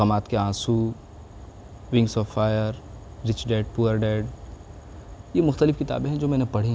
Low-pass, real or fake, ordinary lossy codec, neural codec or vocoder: none; real; none; none